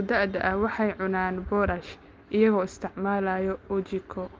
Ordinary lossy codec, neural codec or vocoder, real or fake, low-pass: Opus, 32 kbps; none; real; 7.2 kHz